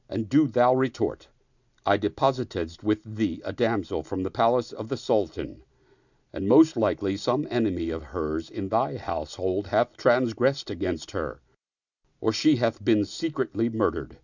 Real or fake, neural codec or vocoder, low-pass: real; none; 7.2 kHz